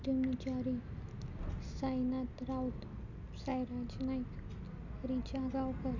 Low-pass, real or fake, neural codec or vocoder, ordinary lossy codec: 7.2 kHz; real; none; none